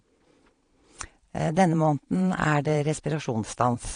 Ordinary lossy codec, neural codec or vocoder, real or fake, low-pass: AAC, 48 kbps; vocoder, 22.05 kHz, 80 mel bands, Vocos; fake; 9.9 kHz